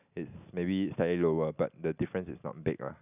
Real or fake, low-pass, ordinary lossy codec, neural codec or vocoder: real; 3.6 kHz; none; none